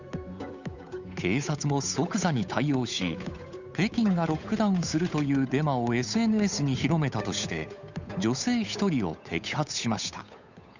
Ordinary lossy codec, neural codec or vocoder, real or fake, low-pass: none; codec, 16 kHz, 8 kbps, FunCodec, trained on Chinese and English, 25 frames a second; fake; 7.2 kHz